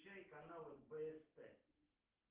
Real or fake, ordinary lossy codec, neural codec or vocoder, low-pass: real; Opus, 16 kbps; none; 3.6 kHz